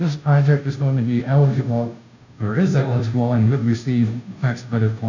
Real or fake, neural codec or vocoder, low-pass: fake; codec, 16 kHz, 0.5 kbps, FunCodec, trained on Chinese and English, 25 frames a second; 7.2 kHz